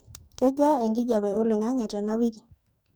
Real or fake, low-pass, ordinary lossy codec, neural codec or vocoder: fake; none; none; codec, 44.1 kHz, 2.6 kbps, DAC